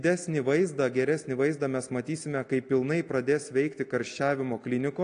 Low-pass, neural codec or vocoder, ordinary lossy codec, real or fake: 9.9 kHz; none; AAC, 48 kbps; real